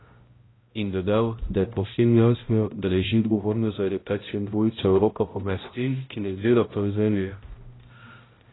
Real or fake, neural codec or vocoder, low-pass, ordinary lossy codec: fake; codec, 16 kHz, 0.5 kbps, X-Codec, HuBERT features, trained on balanced general audio; 7.2 kHz; AAC, 16 kbps